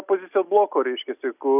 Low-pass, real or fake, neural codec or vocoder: 3.6 kHz; real; none